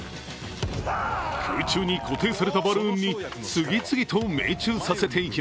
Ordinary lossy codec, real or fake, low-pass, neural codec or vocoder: none; real; none; none